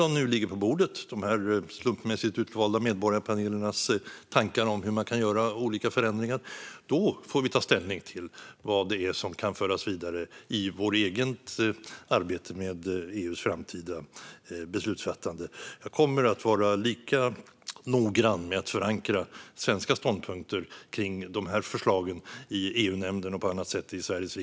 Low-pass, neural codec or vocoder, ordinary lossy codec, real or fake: none; none; none; real